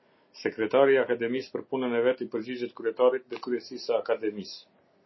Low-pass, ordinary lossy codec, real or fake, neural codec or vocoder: 7.2 kHz; MP3, 24 kbps; real; none